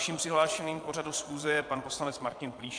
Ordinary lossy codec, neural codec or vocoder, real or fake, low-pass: MP3, 64 kbps; vocoder, 22.05 kHz, 80 mel bands, Vocos; fake; 9.9 kHz